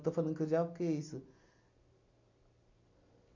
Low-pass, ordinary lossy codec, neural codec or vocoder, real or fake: 7.2 kHz; none; none; real